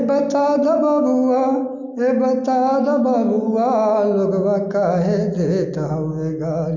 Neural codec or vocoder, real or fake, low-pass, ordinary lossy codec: none; real; 7.2 kHz; none